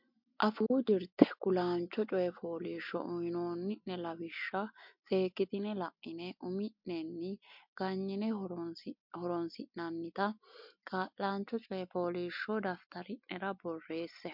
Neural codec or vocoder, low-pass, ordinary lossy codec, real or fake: none; 5.4 kHz; MP3, 48 kbps; real